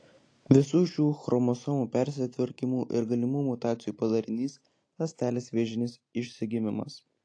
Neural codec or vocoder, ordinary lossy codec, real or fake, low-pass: none; AAC, 48 kbps; real; 9.9 kHz